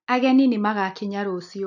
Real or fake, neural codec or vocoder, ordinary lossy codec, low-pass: real; none; none; 7.2 kHz